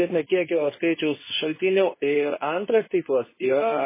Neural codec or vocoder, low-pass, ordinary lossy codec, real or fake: codec, 24 kHz, 0.9 kbps, WavTokenizer, medium speech release version 2; 3.6 kHz; MP3, 16 kbps; fake